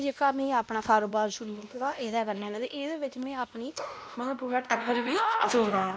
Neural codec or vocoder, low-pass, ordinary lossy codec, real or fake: codec, 16 kHz, 1 kbps, X-Codec, WavLM features, trained on Multilingual LibriSpeech; none; none; fake